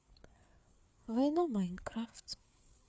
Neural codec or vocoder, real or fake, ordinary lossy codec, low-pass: codec, 16 kHz, 4 kbps, FreqCodec, larger model; fake; none; none